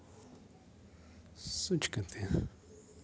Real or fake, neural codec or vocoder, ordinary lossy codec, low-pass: real; none; none; none